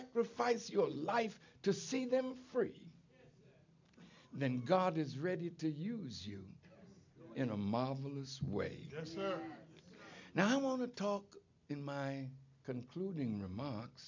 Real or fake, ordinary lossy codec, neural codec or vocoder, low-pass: real; MP3, 64 kbps; none; 7.2 kHz